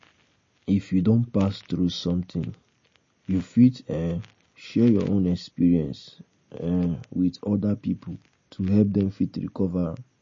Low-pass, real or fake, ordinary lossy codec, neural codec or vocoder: 7.2 kHz; real; MP3, 32 kbps; none